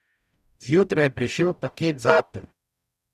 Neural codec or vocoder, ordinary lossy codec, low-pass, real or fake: codec, 44.1 kHz, 0.9 kbps, DAC; none; 14.4 kHz; fake